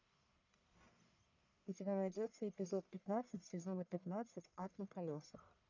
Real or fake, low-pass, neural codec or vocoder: fake; 7.2 kHz; codec, 44.1 kHz, 1.7 kbps, Pupu-Codec